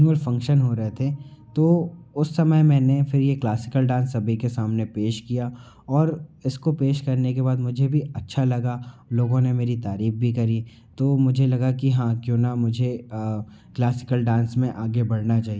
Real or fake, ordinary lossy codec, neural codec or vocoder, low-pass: real; none; none; none